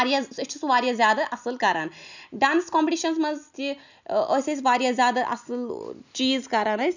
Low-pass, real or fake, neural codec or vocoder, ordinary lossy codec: 7.2 kHz; real; none; none